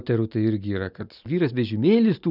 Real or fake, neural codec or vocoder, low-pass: real; none; 5.4 kHz